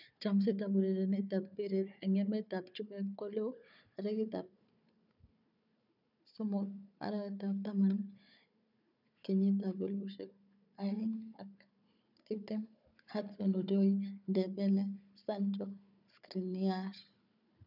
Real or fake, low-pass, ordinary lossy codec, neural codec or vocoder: fake; 5.4 kHz; none; codec, 16 kHz, 4 kbps, FunCodec, trained on Chinese and English, 50 frames a second